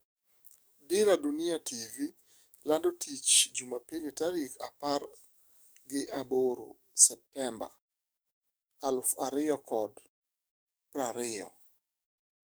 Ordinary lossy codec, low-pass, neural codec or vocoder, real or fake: none; none; codec, 44.1 kHz, 7.8 kbps, DAC; fake